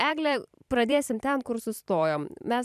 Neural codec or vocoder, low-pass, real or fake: vocoder, 44.1 kHz, 128 mel bands every 512 samples, BigVGAN v2; 14.4 kHz; fake